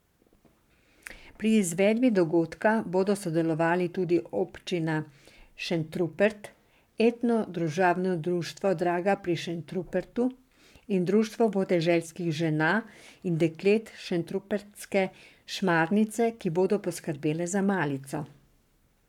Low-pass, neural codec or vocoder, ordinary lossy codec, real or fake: 19.8 kHz; codec, 44.1 kHz, 7.8 kbps, Pupu-Codec; none; fake